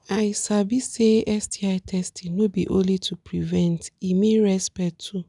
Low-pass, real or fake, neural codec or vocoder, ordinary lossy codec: 10.8 kHz; real; none; none